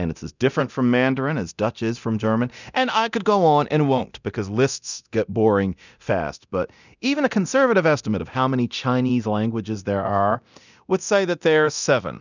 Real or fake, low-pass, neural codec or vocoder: fake; 7.2 kHz; codec, 24 kHz, 0.9 kbps, DualCodec